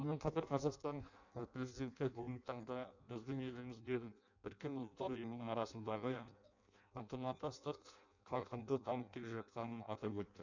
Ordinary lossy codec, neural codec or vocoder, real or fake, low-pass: none; codec, 16 kHz in and 24 kHz out, 0.6 kbps, FireRedTTS-2 codec; fake; 7.2 kHz